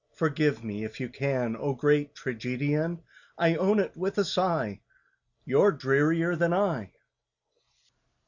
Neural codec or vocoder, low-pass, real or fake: none; 7.2 kHz; real